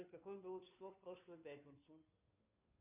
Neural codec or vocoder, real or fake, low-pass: codec, 16 kHz, 2 kbps, FunCodec, trained on Chinese and English, 25 frames a second; fake; 3.6 kHz